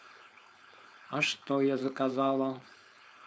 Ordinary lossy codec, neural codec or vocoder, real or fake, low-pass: none; codec, 16 kHz, 4.8 kbps, FACodec; fake; none